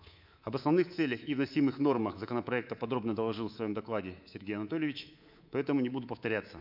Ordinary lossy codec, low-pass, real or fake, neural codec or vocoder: none; 5.4 kHz; fake; codec, 24 kHz, 3.1 kbps, DualCodec